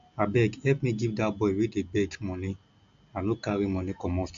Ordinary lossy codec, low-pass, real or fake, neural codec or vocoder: AAC, 64 kbps; 7.2 kHz; real; none